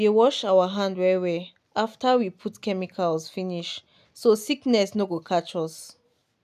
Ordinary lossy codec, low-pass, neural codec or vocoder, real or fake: none; 14.4 kHz; none; real